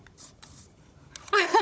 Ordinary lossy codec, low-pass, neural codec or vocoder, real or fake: none; none; codec, 16 kHz, 16 kbps, FunCodec, trained on Chinese and English, 50 frames a second; fake